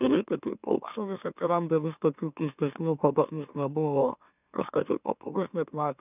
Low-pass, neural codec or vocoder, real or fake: 3.6 kHz; autoencoder, 44.1 kHz, a latent of 192 numbers a frame, MeloTTS; fake